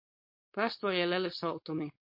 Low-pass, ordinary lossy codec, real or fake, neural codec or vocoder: 5.4 kHz; MP3, 32 kbps; fake; codec, 16 kHz, 4.8 kbps, FACodec